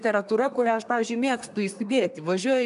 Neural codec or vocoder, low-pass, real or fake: codec, 24 kHz, 1 kbps, SNAC; 10.8 kHz; fake